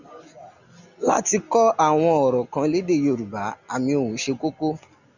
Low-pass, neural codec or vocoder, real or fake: 7.2 kHz; none; real